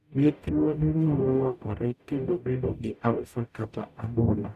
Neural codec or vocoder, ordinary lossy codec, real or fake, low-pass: codec, 44.1 kHz, 0.9 kbps, DAC; none; fake; 14.4 kHz